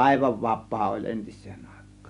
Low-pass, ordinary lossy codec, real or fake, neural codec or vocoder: 10.8 kHz; none; real; none